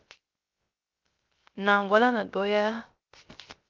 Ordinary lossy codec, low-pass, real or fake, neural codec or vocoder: Opus, 32 kbps; 7.2 kHz; fake; codec, 16 kHz, 0.3 kbps, FocalCodec